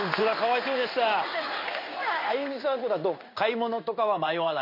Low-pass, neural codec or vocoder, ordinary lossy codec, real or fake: 5.4 kHz; codec, 16 kHz in and 24 kHz out, 1 kbps, XY-Tokenizer; MP3, 32 kbps; fake